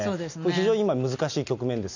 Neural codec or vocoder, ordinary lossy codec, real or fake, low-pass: none; none; real; 7.2 kHz